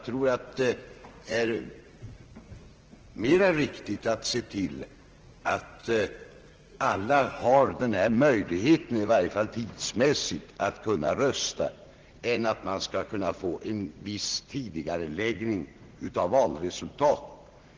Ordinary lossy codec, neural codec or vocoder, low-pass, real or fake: Opus, 16 kbps; vocoder, 44.1 kHz, 80 mel bands, Vocos; 7.2 kHz; fake